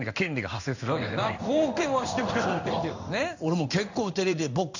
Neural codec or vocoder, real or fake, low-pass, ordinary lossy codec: codec, 16 kHz in and 24 kHz out, 1 kbps, XY-Tokenizer; fake; 7.2 kHz; none